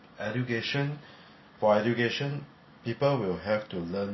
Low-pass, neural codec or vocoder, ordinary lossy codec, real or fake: 7.2 kHz; none; MP3, 24 kbps; real